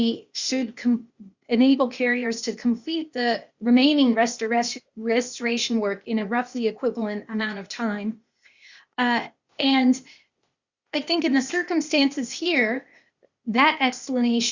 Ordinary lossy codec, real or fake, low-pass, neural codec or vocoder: Opus, 64 kbps; fake; 7.2 kHz; codec, 16 kHz, 0.8 kbps, ZipCodec